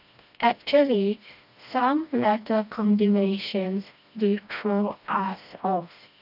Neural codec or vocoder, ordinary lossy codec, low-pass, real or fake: codec, 16 kHz, 1 kbps, FreqCodec, smaller model; none; 5.4 kHz; fake